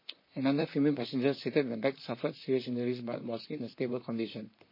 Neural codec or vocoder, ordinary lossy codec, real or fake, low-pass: vocoder, 44.1 kHz, 128 mel bands, Pupu-Vocoder; MP3, 24 kbps; fake; 5.4 kHz